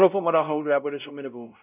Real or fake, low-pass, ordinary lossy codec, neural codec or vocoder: fake; 3.6 kHz; none; codec, 16 kHz, 0.5 kbps, X-Codec, WavLM features, trained on Multilingual LibriSpeech